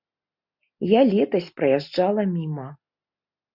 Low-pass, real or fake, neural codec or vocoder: 5.4 kHz; real; none